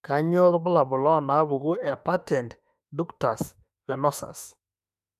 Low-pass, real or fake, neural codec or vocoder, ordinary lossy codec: 14.4 kHz; fake; autoencoder, 48 kHz, 32 numbers a frame, DAC-VAE, trained on Japanese speech; none